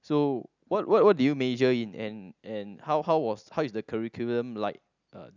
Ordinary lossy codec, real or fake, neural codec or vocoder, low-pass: none; real; none; 7.2 kHz